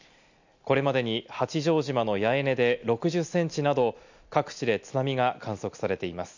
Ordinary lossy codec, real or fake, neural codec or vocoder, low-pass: none; real; none; 7.2 kHz